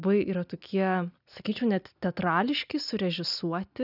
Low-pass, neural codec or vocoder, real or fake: 5.4 kHz; none; real